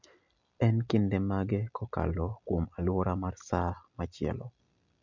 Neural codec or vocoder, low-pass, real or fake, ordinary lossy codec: none; 7.2 kHz; real; MP3, 64 kbps